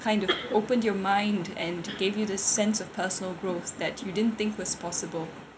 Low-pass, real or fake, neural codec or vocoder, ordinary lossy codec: none; real; none; none